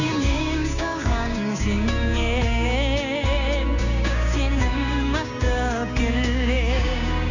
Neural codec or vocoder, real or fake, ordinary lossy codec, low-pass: codec, 16 kHz, 6 kbps, DAC; fake; none; 7.2 kHz